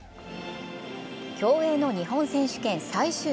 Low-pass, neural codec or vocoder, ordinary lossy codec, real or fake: none; none; none; real